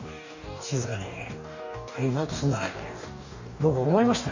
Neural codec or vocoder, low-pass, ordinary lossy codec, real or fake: codec, 44.1 kHz, 2.6 kbps, DAC; 7.2 kHz; none; fake